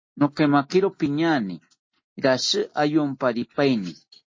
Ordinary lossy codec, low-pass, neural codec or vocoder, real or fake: MP3, 32 kbps; 7.2 kHz; none; real